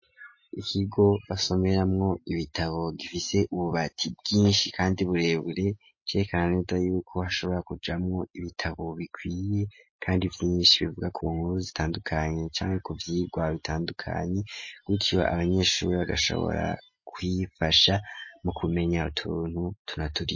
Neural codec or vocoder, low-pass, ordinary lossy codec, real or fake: none; 7.2 kHz; MP3, 32 kbps; real